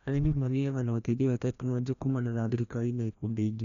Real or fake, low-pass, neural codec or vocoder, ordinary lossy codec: fake; 7.2 kHz; codec, 16 kHz, 1 kbps, FreqCodec, larger model; none